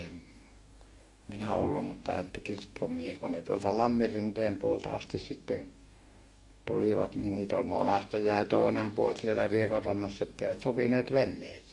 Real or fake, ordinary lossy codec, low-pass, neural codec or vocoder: fake; Opus, 64 kbps; 10.8 kHz; codec, 44.1 kHz, 2.6 kbps, DAC